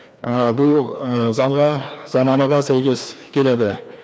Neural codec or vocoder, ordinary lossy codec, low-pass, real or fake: codec, 16 kHz, 2 kbps, FreqCodec, larger model; none; none; fake